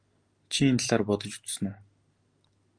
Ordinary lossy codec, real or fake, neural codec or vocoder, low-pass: Opus, 24 kbps; real; none; 9.9 kHz